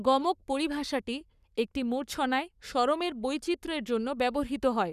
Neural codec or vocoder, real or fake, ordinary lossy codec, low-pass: codec, 44.1 kHz, 7.8 kbps, Pupu-Codec; fake; none; 14.4 kHz